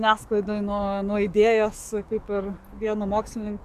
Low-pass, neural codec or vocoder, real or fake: 14.4 kHz; codec, 44.1 kHz, 7.8 kbps, DAC; fake